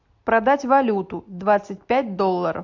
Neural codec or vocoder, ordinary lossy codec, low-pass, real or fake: none; AAC, 48 kbps; 7.2 kHz; real